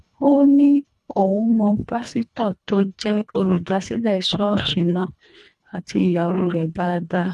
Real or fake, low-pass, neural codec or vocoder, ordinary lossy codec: fake; none; codec, 24 kHz, 1.5 kbps, HILCodec; none